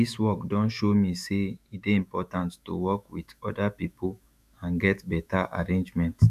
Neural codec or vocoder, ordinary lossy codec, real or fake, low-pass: autoencoder, 48 kHz, 128 numbers a frame, DAC-VAE, trained on Japanese speech; none; fake; 14.4 kHz